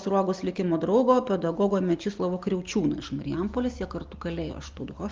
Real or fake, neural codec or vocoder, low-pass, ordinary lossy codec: real; none; 7.2 kHz; Opus, 24 kbps